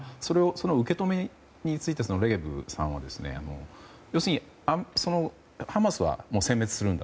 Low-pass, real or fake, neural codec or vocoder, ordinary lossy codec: none; real; none; none